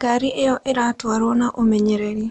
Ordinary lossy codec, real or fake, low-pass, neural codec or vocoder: MP3, 96 kbps; real; 10.8 kHz; none